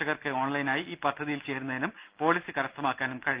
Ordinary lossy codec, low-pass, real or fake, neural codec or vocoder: Opus, 24 kbps; 3.6 kHz; real; none